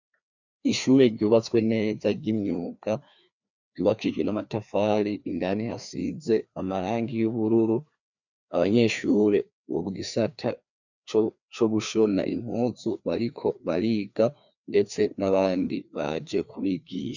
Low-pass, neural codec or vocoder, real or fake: 7.2 kHz; codec, 16 kHz, 2 kbps, FreqCodec, larger model; fake